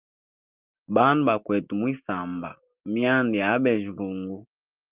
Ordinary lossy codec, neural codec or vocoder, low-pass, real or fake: Opus, 32 kbps; none; 3.6 kHz; real